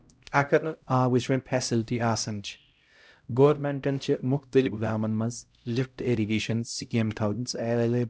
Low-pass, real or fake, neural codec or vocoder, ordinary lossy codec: none; fake; codec, 16 kHz, 0.5 kbps, X-Codec, HuBERT features, trained on LibriSpeech; none